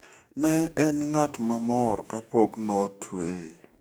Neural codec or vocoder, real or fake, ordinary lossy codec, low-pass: codec, 44.1 kHz, 2.6 kbps, DAC; fake; none; none